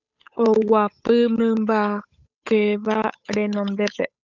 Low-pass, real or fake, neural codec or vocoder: 7.2 kHz; fake; codec, 16 kHz, 8 kbps, FunCodec, trained on Chinese and English, 25 frames a second